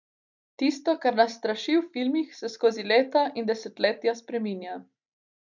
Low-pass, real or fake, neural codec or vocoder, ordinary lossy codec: 7.2 kHz; real; none; none